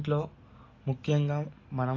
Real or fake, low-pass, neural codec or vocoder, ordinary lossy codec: real; 7.2 kHz; none; AAC, 32 kbps